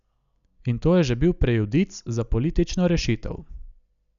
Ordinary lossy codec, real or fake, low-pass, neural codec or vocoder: none; real; 7.2 kHz; none